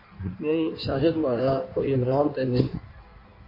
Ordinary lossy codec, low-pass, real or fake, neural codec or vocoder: AAC, 32 kbps; 5.4 kHz; fake; codec, 16 kHz in and 24 kHz out, 1.1 kbps, FireRedTTS-2 codec